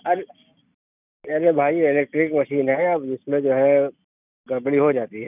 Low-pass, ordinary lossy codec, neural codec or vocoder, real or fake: 3.6 kHz; none; none; real